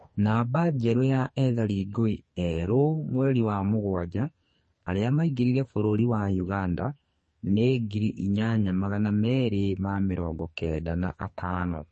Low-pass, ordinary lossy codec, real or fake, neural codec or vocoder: 10.8 kHz; MP3, 32 kbps; fake; codec, 44.1 kHz, 2.6 kbps, SNAC